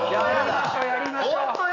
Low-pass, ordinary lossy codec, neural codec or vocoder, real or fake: 7.2 kHz; none; none; real